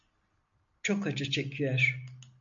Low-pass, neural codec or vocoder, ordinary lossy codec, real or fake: 7.2 kHz; none; MP3, 64 kbps; real